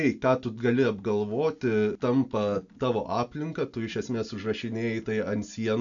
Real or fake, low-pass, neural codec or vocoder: real; 7.2 kHz; none